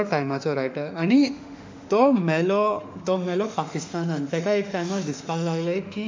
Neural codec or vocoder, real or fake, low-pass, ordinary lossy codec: autoencoder, 48 kHz, 32 numbers a frame, DAC-VAE, trained on Japanese speech; fake; 7.2 kHz; MP3, 64 kbps